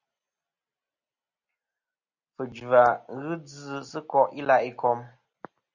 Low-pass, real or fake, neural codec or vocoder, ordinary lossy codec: 7.2 kHz; real; none; Opus, 64 kbps